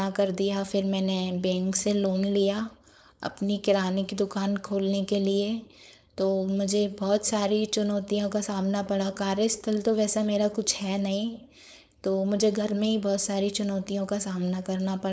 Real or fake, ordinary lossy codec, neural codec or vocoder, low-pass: fake; none; codec, 16 kHz, 4.8 kbps, FACodec; none